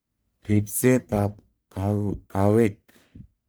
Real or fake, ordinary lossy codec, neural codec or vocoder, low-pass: fake; none; codec, 44.1 kHz, 1.7 kbps, Pupu-Codec; none